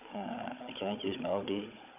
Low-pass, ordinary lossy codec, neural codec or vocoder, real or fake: 3.6 kHz; none; codec, 16 kHz, 16 kbps, FunCodec, trained on Chinese and English, 50 frames a second; fake